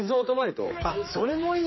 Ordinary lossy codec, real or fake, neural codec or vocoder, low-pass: MP3, 24 kbps; fake; codec, 16 kHz, 4 kbps, X-Codec, HuBERT features, trained on general audio; 7.2 kHz